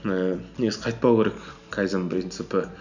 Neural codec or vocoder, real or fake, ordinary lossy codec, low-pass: none; real; none; 7.2 kHz